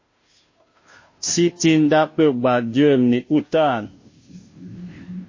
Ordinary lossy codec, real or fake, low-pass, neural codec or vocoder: MP3, 32 kbps; fake; 7.2 kHz; codec, 16 kHz, 0.5 kbps, FunCodec, trained on Chinese and English, 25 frames a second